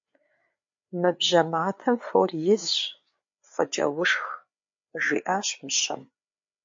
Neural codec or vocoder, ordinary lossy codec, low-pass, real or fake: codec, 16 kHz, 4 kbps, FreqCodec, larger model; MP3, 48 kbps; 7.2 kHz; fake